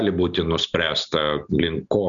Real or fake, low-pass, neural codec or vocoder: real; 7.2 kHz; none